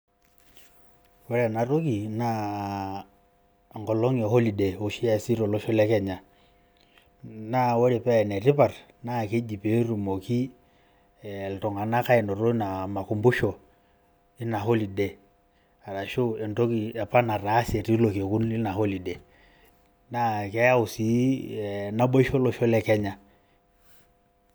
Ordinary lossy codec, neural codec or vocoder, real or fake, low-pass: none; none; real; none